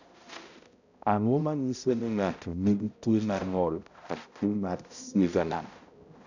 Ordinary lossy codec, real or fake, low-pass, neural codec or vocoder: none; fake; 7.2 kHz; codec, 16 kHz, 0.5 kbps, X-Codec, HuBERT features, trained on balanced general audio